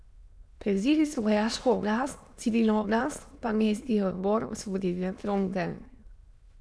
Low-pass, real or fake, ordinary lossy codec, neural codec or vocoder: none; fake; none; autoencoder, 22.05 kHz, a latent of 192 numbers a frame, VITS, trained on many speakers